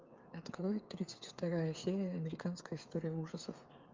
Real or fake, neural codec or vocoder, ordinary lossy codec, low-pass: fake; codec, 16 kHz, 8 kbps, FreqCodec, smaller model; Opus, 32 kbps; 7.2 kHz